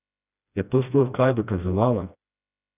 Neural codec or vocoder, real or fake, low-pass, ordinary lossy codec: codec, 16 kHz, 1 kbps, FreqCodec, smaller model; fake; 3.6 kHz; none